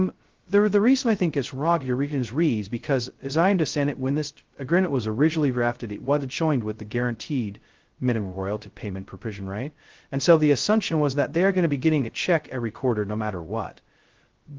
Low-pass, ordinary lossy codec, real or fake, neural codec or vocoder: 7.2 kHz; Opus, 16 kbps; fake; codec, 16 kHz, 0.2 kbps, FocalCodec